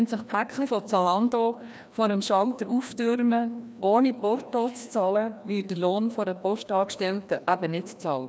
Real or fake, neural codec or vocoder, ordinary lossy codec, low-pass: fake; codec, 16 kHz, 1 kbps, FreqCodec, larger model; none; none